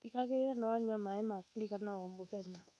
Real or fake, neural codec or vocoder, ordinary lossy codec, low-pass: fake; codec, 24 kHz, 1.2 kbps, DualCodec; none; none